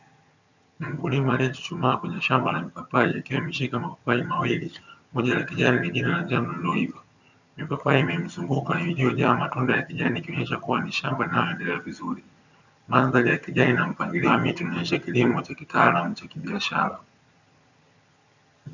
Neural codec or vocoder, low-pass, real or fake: vocoder, 22.05 kHz, 80 mel bands, HiFi-GAN; 7.2 kHz; fake